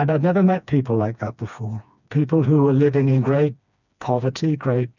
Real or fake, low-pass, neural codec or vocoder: fake; 7.2 kHz; codec, 16 kHz, 2 kbps, FreqCodec, smaller model